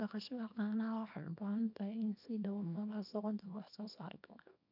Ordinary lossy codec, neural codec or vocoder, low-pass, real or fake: none; codec, 24 kHz, 0.9 kbps, WavTokenizer, small release; 5.4 kHz; fake